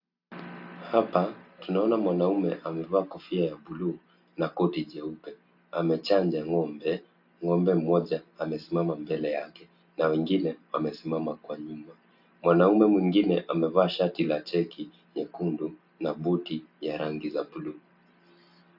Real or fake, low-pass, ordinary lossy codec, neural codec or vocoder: real; 5.4 kHz; AAC, 48 kbps; none